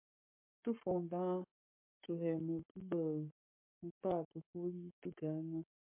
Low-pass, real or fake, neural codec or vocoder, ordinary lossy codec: 3.6 kHz; fake; codec, 44.1 kHz, 7.8 kbps, Pupu-Codec; MP3, 32 kbps